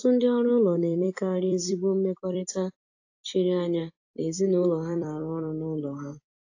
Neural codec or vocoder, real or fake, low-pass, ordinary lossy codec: vocoder, 44.1 kHz, 128 mel bands every 256 samples, BigVGAN v2; fake; 7.2 kHz; AAC, 48 kbps